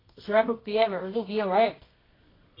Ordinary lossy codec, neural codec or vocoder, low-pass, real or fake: AAC, 24 kbps; codec, 24 kHz, 0.9 kbps, WavTokenizer, medium music audio release; 5.4 kHz; fake